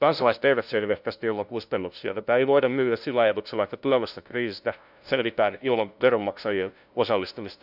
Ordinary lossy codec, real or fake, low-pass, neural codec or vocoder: none; fake; 5.4 kHz; codec, 16 kHz, 0.5 kbps, FunCodec, trained on LibriTTS, 25 frames a second